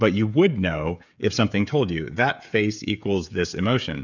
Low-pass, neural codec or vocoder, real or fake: 7.2 kHz; codec, 16 kHz, 16 kbps, FreqCodec, smaller model; fake